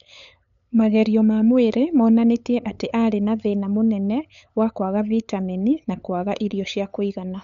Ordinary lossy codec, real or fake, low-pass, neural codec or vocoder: none; fake; 7.2 kHz; codec, 16 kHz, 8 kbps, FunCodec, trained on LibriTTS, 25 frames a second